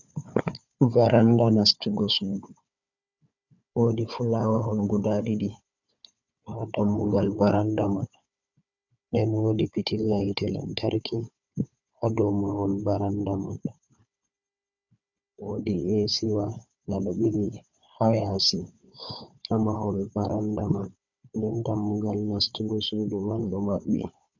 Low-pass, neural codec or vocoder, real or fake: 7.2 kHz; codec, 16 kHz, 4 kbps, FunCodec, trained on Chinese and English, 50 frames a second; fake